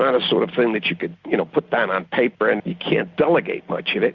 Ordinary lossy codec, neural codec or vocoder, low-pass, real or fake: Opus, 64 kbps; none; 7.2 kHz; real